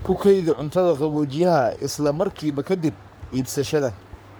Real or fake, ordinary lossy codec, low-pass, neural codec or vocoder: fake; none; none; codec, 44.1 kHz, 3.4 kbps, Pupu-Codec